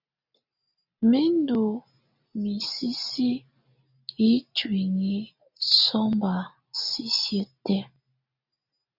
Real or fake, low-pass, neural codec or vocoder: real; 5.4 kHz; none